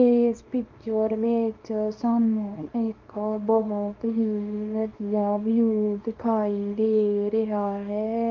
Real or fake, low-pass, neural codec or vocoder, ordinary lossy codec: fake; 7.2 kHz; codec, 24 kHz, 0.9 kbps, WavTokenizer, small release; Opus, 24 kbps